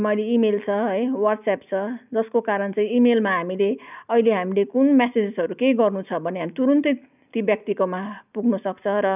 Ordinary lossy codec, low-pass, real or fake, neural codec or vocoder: none; 3.6 kHz; real; none